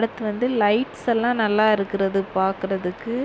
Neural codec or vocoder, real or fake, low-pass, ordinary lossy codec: none; real; none; none